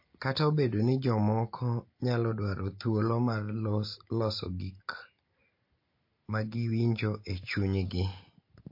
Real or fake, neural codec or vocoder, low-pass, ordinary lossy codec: real; none; 5.4 kHz; MP3, 32 kbps